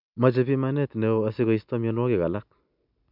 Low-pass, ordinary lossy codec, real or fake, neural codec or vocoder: 5.4 kHz; none; real; none